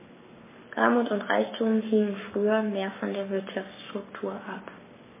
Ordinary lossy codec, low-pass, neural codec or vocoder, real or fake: MP3, 16 kbps; 3.6 kHz; codec, 44.1 kHz, 7.8 kbps, Pupu-Codec; fake